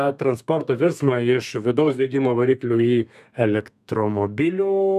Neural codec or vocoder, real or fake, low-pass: codec, 32 kHz, 1.9 kbps, SNAC; fake; 14.4 kHz